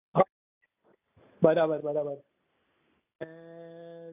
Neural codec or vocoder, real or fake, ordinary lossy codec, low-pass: none; real; AAC, 32 kbps; 3.6 kHz